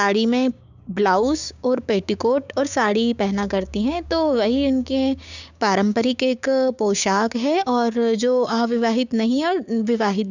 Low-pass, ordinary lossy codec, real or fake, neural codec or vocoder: 7.2 kHz; none; fake; codec, 16 kHz, 6 kbps, DAC